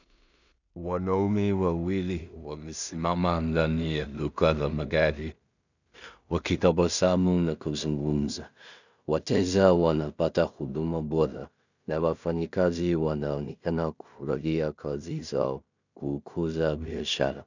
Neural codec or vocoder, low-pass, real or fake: codec, 16 kHz in and 24 kHz out, 0.4 kbps, LongCat-Audio-Codec, two codebook decoder; 7.2 kHz; fake